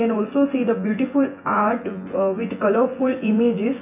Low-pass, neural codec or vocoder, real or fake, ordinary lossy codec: 3.6 kHz; vocoder, 24 kHz, 100 mel bands, Vocos; fake; none